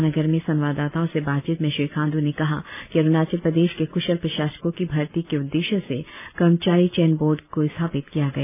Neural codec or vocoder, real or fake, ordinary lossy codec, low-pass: none; real; none; 3.6 kHz